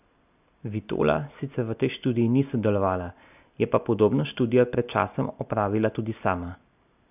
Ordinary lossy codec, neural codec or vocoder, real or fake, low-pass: none; none; real; 3.6 kHz